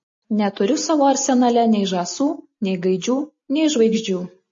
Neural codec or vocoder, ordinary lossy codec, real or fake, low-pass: none; MP3, 32 kbps; real; 7.2 kHz